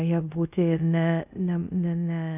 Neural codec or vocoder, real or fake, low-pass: codec, 24 kHz, 0.5 kbps, DualCodec; fake; 3.6 kHz